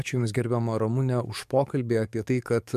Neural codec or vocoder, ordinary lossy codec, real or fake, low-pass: codec, 44.1 kHz, 7.8 kbps, DAC; MP3, 96 kbps; fake; 14.4 kHz